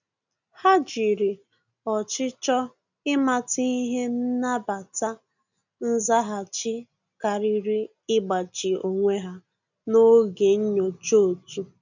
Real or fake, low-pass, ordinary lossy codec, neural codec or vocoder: real; 7.2 kHz; none; none